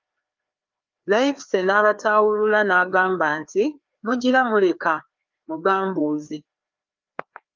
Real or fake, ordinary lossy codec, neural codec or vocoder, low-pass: fake; Opus, 24 kbps; codec, 16 kHz, 2 kbps, FreqCodec, larger model; 7.2 kHz